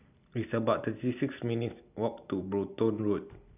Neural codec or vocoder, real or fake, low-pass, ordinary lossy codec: none; real; 3.6 kHz; none